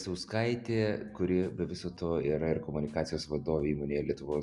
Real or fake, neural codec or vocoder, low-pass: real; none; 10.8 kHz